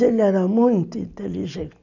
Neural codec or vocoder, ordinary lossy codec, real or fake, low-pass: none; none; real; 7.2 kHz